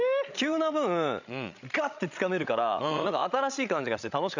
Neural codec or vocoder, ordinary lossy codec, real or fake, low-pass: codec, 16 kHz, 16 kbps, FreqCodec, larger model; none; fake; 7.2 kHz